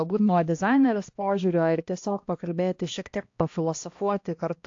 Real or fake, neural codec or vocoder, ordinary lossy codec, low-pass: fake; codec, 16 kHz, 1 kbps, X-Codec, HuBERT features, trained on balanced general audio; AAC, 48 kbps; 7.2 kHz